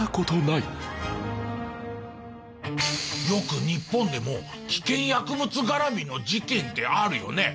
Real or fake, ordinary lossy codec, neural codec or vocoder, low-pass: real; none; none; none